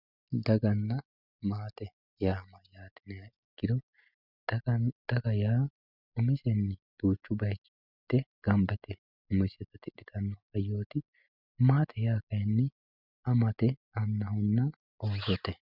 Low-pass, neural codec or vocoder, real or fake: 5.4 kHz; none; real